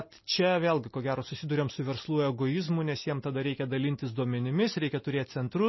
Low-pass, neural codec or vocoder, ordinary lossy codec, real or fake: 7.2 kHz; none; MP3, 24 kbps; real